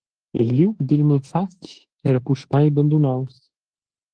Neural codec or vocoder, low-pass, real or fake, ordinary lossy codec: autoencoder, 48 kHz, 32 numbers a frame, DAC-VAE, trained on Japanese speech; 9.9 kHz; fake; Opus, 16 kbps